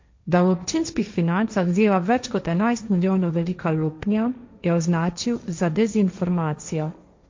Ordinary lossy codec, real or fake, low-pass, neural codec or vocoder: MP3, 48 kbps; fake; 7.2 kHz; codec, 16 kHz, 1.1 kbps, Voila-Tokenizer